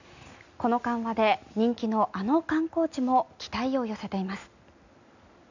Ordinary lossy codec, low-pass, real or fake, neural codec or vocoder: none; 7.2 kHz; real; none